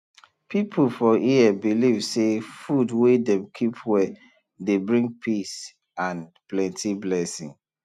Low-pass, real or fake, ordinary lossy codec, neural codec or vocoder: 14.4 kHz; real; none; none